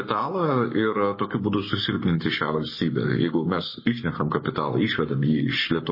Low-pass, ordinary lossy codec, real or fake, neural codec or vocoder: 5.4 kHz; MP3, 24 kbps; fake; codec, 44.1 kHz, 7.8 kbps, Pupu-Codec